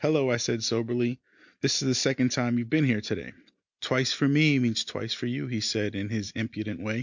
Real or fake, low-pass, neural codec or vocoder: real; 7.2 kHz; none